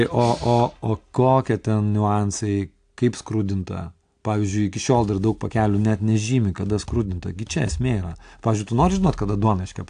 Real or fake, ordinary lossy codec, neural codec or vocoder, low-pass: real; AAC, 64 kbps; none; 9.9 kHz